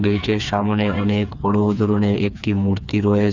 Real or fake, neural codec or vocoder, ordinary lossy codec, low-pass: fake; codec, 16 kHz, 4 kbps, FreqCodec, smaller model; none; 7.2 kHz